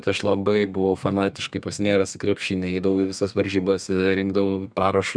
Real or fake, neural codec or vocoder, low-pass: fake; codec, 32 kHz, 1.9 kbps, SNAC; 9.9 kHz